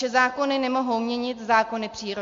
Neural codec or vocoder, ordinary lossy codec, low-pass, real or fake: none; MP3, 48 kbps; 7.2 kHz; real